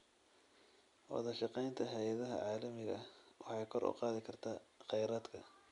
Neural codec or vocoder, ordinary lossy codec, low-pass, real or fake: none; none; 10.8 kHz; real